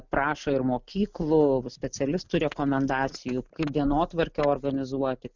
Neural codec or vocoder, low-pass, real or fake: none; 7.2 kHz; real